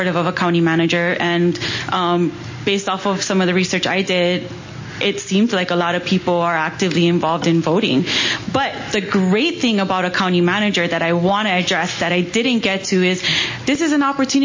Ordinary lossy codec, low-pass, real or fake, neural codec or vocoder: MP3, 32 kbps; 7.2 kHz; real; none